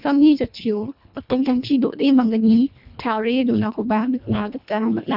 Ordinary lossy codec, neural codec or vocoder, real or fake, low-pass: none; codec, 24 kHz, 1.5 kbps, HILCodec; fake; 5.4 kHz